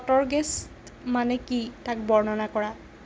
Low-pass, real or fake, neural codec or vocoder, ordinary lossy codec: none; real; none; none